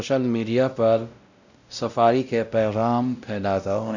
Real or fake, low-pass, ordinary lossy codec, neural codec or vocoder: fake; 7.2 kHz; none; codec, 16 kHz, 0.5 kbps, X-Codec, WavLM features, trained on Multilingual LibriSpeech